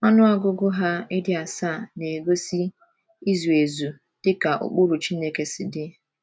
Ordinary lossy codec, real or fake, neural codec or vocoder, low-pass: none; real; none; none